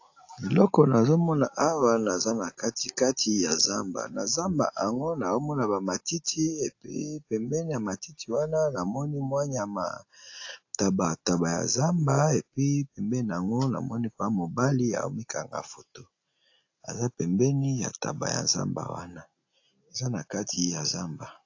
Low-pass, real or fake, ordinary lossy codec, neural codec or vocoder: 7.2 kHz; real; AAC, 48 kbps; none